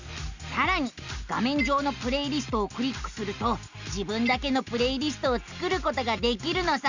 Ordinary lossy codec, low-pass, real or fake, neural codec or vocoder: Opus, 64 kbps; 7.2 kHz; real; none